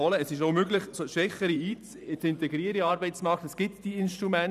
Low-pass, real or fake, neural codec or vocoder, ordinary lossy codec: 14.4 kHz; fake; vocoder, 44.1 kHz, 128 mel bands every 512 samples, BigVGAN v2; none